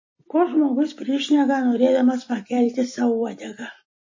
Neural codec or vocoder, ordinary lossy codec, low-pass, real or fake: none; MP3, 32 kbps; 7.2 kHz; real